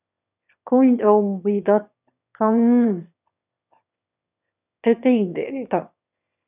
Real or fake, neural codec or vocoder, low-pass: fake; autoencoder, 22.05 kHz, a latent of 192 numbers a frame, VITS, trained on one speaker; 3.6 kHz